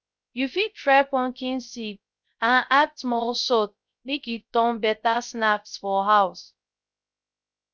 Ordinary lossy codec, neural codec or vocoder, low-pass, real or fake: none; codec, 16 kHz, 0.3 kbps, FocalCodec; none; fake